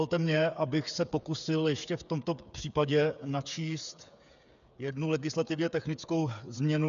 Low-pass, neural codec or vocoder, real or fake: 7.2 kHz; codec, 16 kHz, 8 kbps, FreqCodec, smaller model; fake